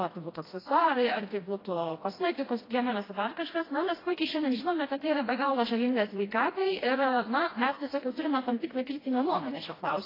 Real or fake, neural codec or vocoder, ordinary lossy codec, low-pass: fake; codec, 16 kHz, 1 kbps, FreqCodec, smaller model; AAC, 24 kbps; 5.4 kHz